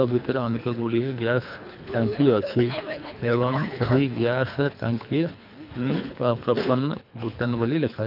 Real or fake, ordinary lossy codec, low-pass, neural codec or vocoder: fake; none; 5.4 kHz; codec, 24 kHz, 3 kbps, HILCodec